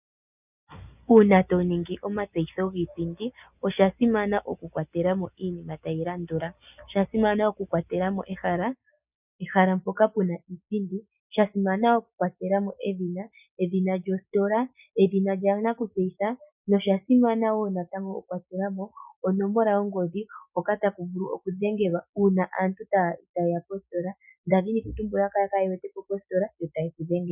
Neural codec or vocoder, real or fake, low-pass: none; real; 3.6 kHz